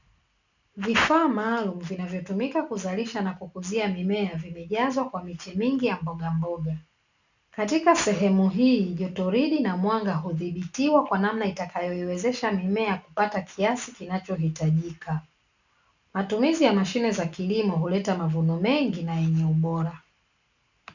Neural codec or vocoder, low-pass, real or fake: none; 7.2 kHz; real